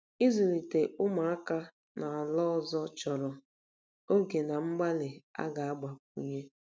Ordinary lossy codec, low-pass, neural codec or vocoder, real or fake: none; none; none; real